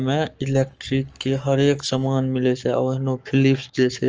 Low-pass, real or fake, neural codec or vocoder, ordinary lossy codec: 7.2 kHz; fake; codec, 44.1 kHz, 7.8 kbps, Pupu-Codec; Opus, 24 kbps